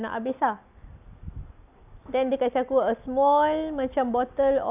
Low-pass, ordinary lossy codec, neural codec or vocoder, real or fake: 3.6 kHz; none; none; real